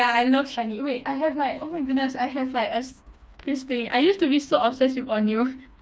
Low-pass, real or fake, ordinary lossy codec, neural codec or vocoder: none; fake; none; codec, 16 kHz, 2 kbps, FreqCodec, smaller model